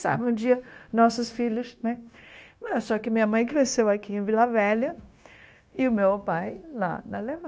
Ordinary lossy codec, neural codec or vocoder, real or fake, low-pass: none; codec, 16 kHz, 0.9 kbps, LongCat-Audio-Codec; fake; none